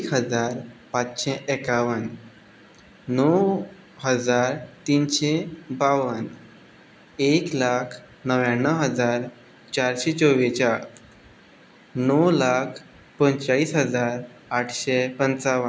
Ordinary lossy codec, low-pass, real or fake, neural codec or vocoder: none; none; real; none